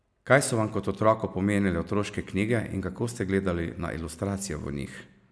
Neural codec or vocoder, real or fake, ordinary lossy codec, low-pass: none; real; none; none